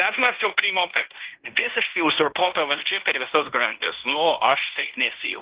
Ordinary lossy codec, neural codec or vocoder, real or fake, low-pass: Opus, 16 kbps; codec, 16 kHz in and 24 kHz out, 0.9 kbps, LongCat-Audio-Codec, fine tuned four codebook decoder; fake; 3.6 kHz